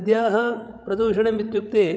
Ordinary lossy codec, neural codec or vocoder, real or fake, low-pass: none; codec, 16 kHz, 16 kbps, FreqCodec, larger model; fake; none